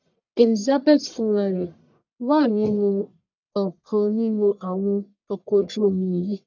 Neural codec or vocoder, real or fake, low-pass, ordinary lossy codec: codec, 44.1 kHz, 1.7 kbps, Pupu-Codec; fake; 7.2 kHz; none